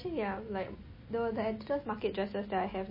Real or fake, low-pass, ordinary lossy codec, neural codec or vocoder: real; 5.4 kHz; MP3, 24 kbps; none